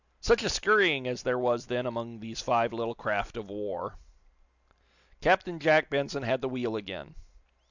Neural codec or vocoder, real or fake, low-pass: none; real; 7.2 kHz